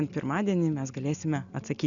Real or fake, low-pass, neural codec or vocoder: real; 7.2 kHz; none